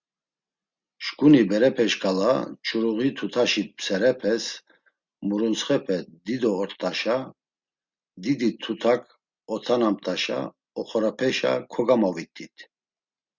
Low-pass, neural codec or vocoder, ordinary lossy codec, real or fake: 7.2 kHz; none; Opus, 64 kbps; real